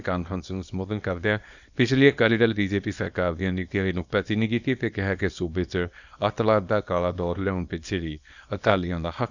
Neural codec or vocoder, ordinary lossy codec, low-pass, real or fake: codec, 24 kHz, 0.9 kbps, WavTokenizer, small release; none; 7.2 kHz; fake